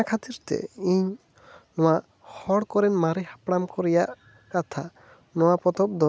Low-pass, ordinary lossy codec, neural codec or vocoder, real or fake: none; none; none; real